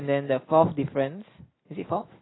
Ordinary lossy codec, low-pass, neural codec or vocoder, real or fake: AAC, 16 kbps; 7.2 kHz; none; real